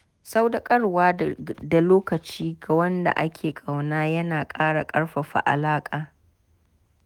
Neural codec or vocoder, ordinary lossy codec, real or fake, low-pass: autoencoder, 48 kHz, 128 numbers a frame, DAC-VAE, trained on Japanese speech; Opus, 32 kbps; fake; 19.8 kHz